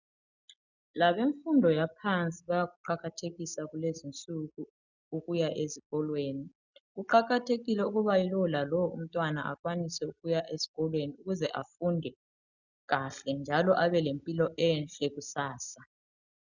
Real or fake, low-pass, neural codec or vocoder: real; 7.2 kHz; none